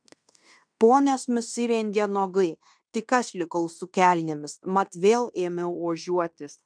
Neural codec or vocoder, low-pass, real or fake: codec, 16 kHz in and 24 kHz out, 0.9 kbps, LongCat-Audio-Codec, fine tuned four codebook decoder; 9.9 kHz; fake